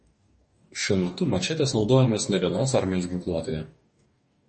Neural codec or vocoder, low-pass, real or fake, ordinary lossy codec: codec, 44.1 kHz, 2.6 kbps, DAC; 10.8 kHz; fake; MP3, 32 kbps